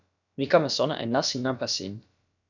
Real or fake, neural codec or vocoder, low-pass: fake; codec, 16 kHz, about 1 kbps, DyCAST, with the encoder's durations; 7.2 kHz